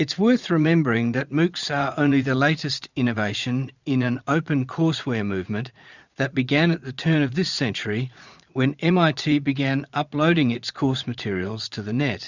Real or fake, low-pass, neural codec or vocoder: fake; 7.2 kHz; vocoder, 44.1 kHz, 80 mel bands, Vocos